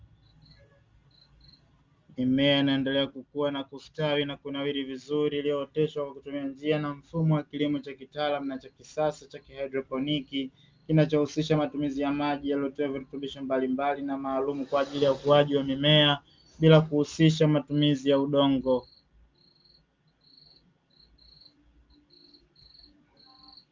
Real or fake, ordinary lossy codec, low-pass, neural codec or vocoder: real; Opus, 32 kbps; 7.2 kHz; none